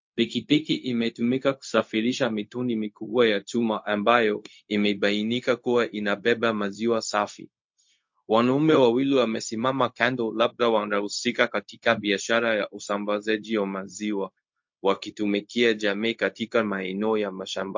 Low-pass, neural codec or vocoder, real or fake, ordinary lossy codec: 7.2 kHz; codec, 16 kHz, 0.4 kbps, LongCat-Audio-Codec; fake; MP3, 48 kbps